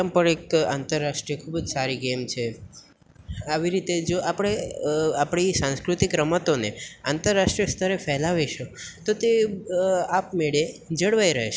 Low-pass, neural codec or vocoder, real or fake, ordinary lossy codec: none; none; real; none